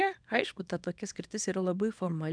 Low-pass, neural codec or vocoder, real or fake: 9.9 kHz; codec, 24 kHz, 0.9 kbps, WavTokenizer, small release; fake